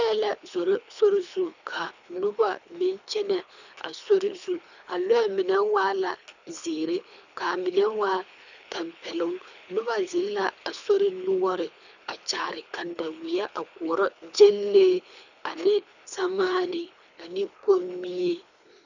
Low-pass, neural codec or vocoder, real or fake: 7.2 kHz; codec, 24 kHz, 3 kbps, HILCodec; fake